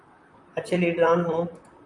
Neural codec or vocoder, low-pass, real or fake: vocoder, 44.1 kHz, 128 mel bands, Pupu-Vocoder; 10.8 kHz; fake